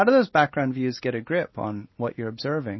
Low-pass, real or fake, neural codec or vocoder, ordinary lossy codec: 7.2 kHz; real; none; MP3, 24 kbps